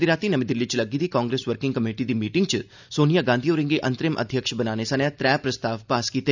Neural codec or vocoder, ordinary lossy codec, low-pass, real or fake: none; none; 7.2 kHz; real